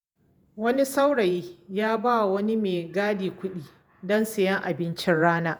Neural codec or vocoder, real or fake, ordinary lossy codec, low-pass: vocoder, 48 kHz, 128 mel bands, Vocos; fake; none; none